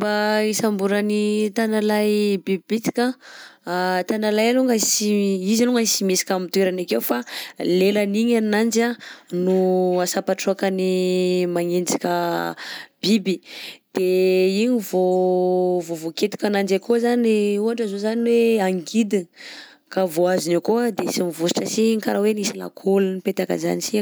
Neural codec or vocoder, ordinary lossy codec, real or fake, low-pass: none; none; real; none